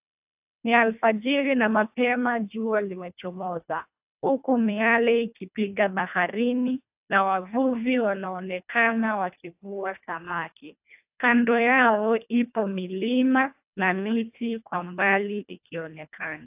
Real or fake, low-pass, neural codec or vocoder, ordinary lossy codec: fake; 3.6 kHz; codec, 24 kHz, 1.5 kbps, HILCodec; AAC, 32 kbps